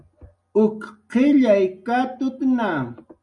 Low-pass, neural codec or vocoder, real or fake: 10.8 kHz; none; real